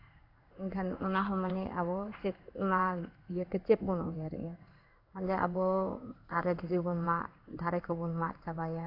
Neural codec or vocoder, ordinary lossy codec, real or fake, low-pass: codec, 16 kHz in and 24 kHz out, 1 kbps, XY-Tokenizer; AAC, 32 kbps; fake; 5.4 kHz